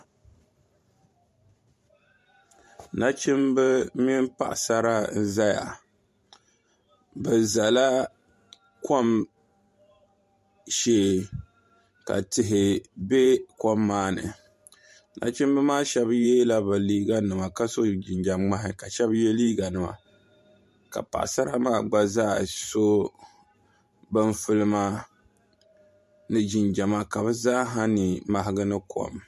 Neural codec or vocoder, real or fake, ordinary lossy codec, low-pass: vocoder, 44.1 kHz, 128 mel bands every 512 samples, BigVGAN v2; fake; MP3, 64 kbps; 14.4 kHz